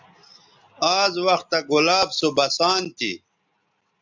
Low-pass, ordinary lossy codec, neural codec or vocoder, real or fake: 7.2 kHz; MP3, 64 kbps; none; real